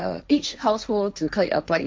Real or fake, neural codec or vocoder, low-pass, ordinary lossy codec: fake; codec, 16 kHz, 1.1 kbps, Voila-Tokenizer; none; none